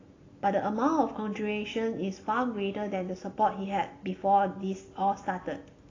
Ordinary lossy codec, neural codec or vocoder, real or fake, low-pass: AAC, 32 kbps; none; real; 7.2 kHz